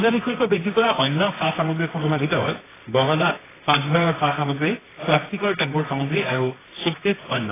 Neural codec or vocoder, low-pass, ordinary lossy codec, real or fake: codec, 24 kHz, 0.9 kbps, WavTokenizer, medium music audio release; 3.6 kHz; AAC, 16 kbps; fake